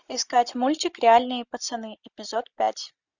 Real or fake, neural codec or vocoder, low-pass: real; none; 7.2 kHz